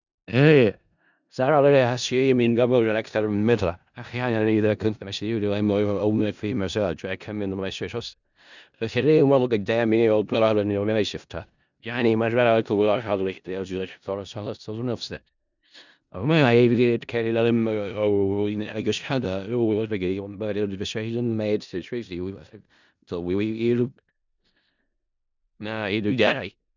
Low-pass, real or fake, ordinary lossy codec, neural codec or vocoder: 7.2 kHz; fake; none; codec, 16 kHz in and 24 kHz out, 0.4 kbps, LongCat-Audio-Codec, four codebook decoder